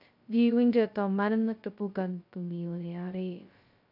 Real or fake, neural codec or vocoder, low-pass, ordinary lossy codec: fake; codec, 16 kHz, 0.2 kbps, FocalCodec; 5.4 kHz; none